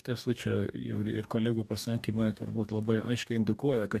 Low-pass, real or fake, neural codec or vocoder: 14.4 kHz; fake; codec, 44.1 kHz, 2.6 kbps, DAC